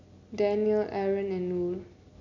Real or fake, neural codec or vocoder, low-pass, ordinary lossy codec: real; none; 7.2 kHz; none